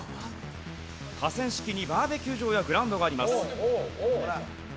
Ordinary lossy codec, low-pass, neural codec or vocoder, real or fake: none; none; none; real